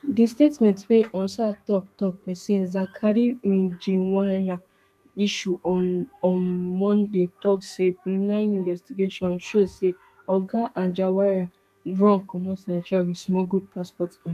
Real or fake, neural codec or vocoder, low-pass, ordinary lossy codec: fake; codec, 32 kHz, 1.9 kbps, SNAC; 14.4 kHz; none